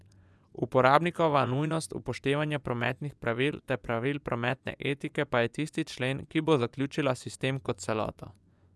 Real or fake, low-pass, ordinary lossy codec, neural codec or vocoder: fake; none; none; vocoder, 24 kHz, 100 mel bands, Vocos